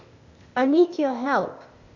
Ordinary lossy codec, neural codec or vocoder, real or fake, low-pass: MP3, 64 kbps; codec, 16 kHz, 0.8 kbps, ZipCodec; fake; 7.2 kHz